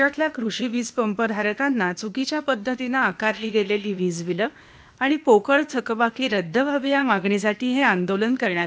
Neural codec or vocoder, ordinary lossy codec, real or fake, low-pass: codec, 16 kHz, 0.8 kbps, ZipCodec; none; fake; none